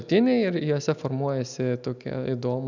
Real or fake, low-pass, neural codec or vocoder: real; 7.2 kHz; none